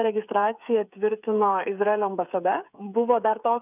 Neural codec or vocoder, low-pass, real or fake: codec, 16 kHz, 8 kbps, FreqCodec, smaller model; 3.6 kHz; fake